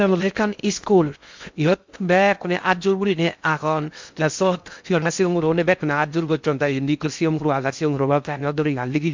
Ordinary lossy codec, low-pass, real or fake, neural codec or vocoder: MP3, 64 kbps; 7.2 kHz; fake; codec, 16 kHz in and 24 kHz out, 0.6 kbps, FocalCodec, streaming, 2048 codes